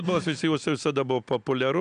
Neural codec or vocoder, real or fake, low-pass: none; real; 9.9 kHz